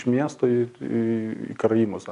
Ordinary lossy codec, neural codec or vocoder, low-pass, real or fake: MP3, 64 kbps; none; 10.8 kHz; real